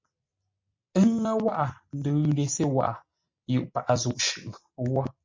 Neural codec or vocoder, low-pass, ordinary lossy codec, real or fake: none; 7.2 kHz; MP3, 48 kbps; real